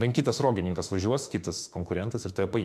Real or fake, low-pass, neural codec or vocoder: fake; 14.4 kHz; autoencoder, 48 kHz, 32 numbers a frame, DAC-VAE, trained on Japanese speech